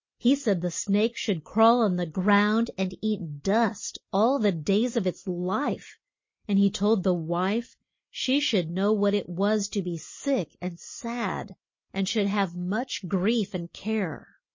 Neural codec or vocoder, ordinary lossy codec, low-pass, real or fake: none; MP3, 32 kbps; 7.2 kHz; real